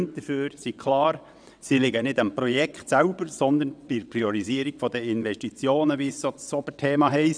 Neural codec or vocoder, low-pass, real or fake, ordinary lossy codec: vocoder, 22.05 kHz, 80 mel bands, WaveNeXt; 9.9 kHz; fake; none